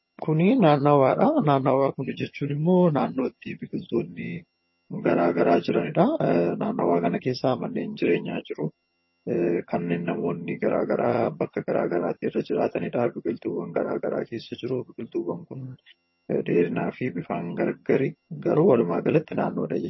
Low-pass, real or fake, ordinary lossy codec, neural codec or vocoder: 7.2 kHz; fake; MP3, 24 kbps; vocoder, 22.05 kHz, 80 mel bands, HiFi-GAN